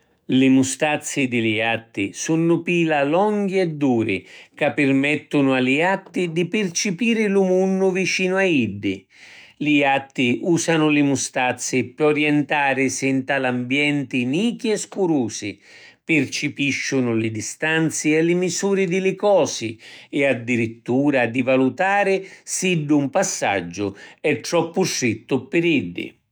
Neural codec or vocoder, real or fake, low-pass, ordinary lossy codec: autoencoder, 48 kHz, 128 numbers a frame, DAC-VAE, trained on Japanese speech; fake; none; none